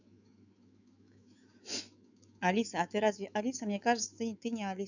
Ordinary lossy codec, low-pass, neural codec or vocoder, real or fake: none; 7.2 kHz; autoencoder, 48 kHz, 128 numbers a frame, DAC-VAE, trained on Japanese speech; fake